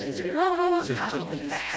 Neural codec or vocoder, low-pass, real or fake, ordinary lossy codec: codec, 16 kHz, 0.5 kbps, FreqCodec, smaller model; none; fake; none